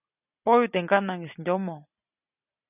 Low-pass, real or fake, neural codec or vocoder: 3.6 kHz; real; none